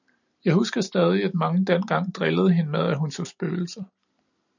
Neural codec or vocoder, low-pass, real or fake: none; 7.2 kHz; real